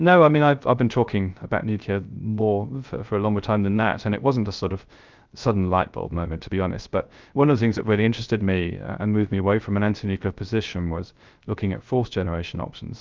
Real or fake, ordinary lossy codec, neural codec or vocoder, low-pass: fake; Opus, 24 kbps; codec, 16 kHz, 0.3 kbps, FocalCodec; 7.2 kHz